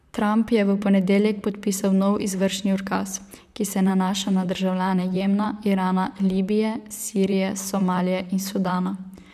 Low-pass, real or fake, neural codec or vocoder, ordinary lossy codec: 14.4 kHz; fake; vocoder, 44.1 kHz, 128 mel bands, Pupu-Vocoder; none